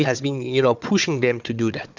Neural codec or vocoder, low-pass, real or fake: codec, 16 kHz, 4 kbps, FunCodec, trained on Chinese and English, 50 frames a second; 7.2 kHz; fake